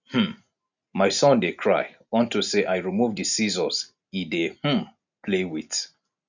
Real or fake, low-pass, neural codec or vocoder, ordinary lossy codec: real; 7.2 kHz; none; none